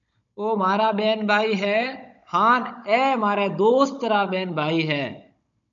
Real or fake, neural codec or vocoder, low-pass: fake; codec, 16 kHz, 16 kbps, FunCodec, trained on Chinese and English, 50 frames a second; 7.2 kHz